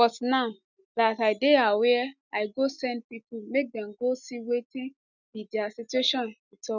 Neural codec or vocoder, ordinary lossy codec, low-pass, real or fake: none; none; 7.2 kHz; real